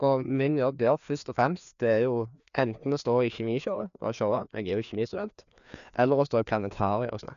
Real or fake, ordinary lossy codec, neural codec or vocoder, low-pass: fake; none; codec, 16 kHz, 2 kbps, FreqCodec, larger model; 7.2 kHz